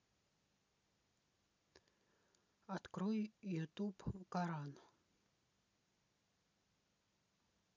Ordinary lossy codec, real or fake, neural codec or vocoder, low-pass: none; real; none; 7.2 kHz